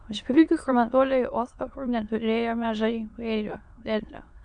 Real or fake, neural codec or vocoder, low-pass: fake; autoencoder, 22.05 kHz, a latent of 192 numbers a frame, VITS, trained on many speakers; 9.9 kHz